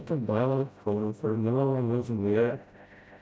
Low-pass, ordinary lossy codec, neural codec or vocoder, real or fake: none; none; codec, 16 kHz, 0.5 kbps, FreqCodec, smaller model; fake